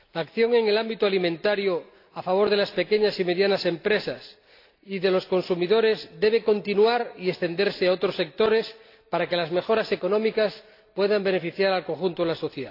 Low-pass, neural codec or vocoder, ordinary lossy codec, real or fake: 5.4 kHz; none; AAC, 32 kbps; real